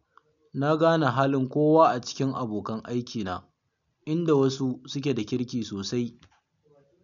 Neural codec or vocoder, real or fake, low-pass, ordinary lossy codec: none; real; 7.2 kHz; none